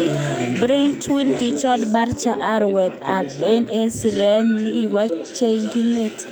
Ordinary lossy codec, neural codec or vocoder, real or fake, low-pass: none; codec, 44.1 kHz, 2.6 kbps, SNAC; fake; none